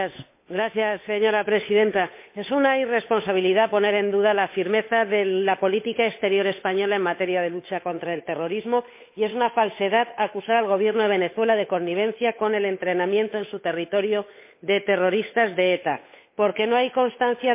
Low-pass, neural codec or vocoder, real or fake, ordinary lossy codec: 3.6 kHz; codec, 16 kHz, 8 kbps, FunCodec, trained on Chinese and English, 25 frames a second; fake; MP3, 24 kbps